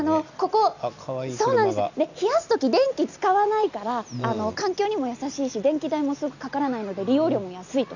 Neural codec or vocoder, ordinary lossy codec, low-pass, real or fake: none; none; 7.2 kHz; real